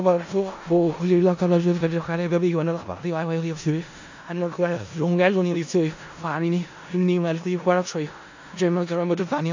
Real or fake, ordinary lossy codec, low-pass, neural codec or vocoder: fake; AAC, 48 kbps; 7.2 kHz; codec, 16 kHz in and 24 kHz out, 0.4 kbps, LongCat-Audio-Codec, four codebook decoder